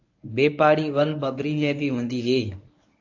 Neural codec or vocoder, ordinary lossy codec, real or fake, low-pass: codec, 24 kHz, 0.9 kbps, WavTokenizer, medium speech release version 1; AAC, 48 kbps; fake; 7.2 kHz